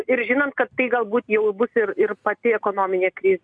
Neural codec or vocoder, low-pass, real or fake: none; 9.9 kHz; real